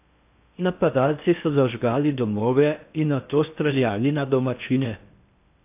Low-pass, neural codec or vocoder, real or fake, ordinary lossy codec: 3.6 kHz; codec, 16 kHz in and 24 kHz out, 0.6 kbps, FocalCodec, streaming, 4096 codes; fake; AAC, 32 kbps